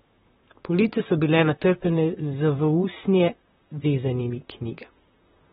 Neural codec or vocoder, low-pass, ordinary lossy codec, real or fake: vocoder, 44.1 kHz, 128 mel bands, Pupu-Vocoder; 19.8 kHz; AAC, 16 kbps; fake